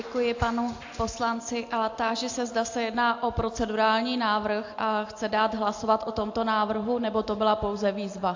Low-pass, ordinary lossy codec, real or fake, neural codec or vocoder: 7.2 kHz; AAC, 48 kbps; real; none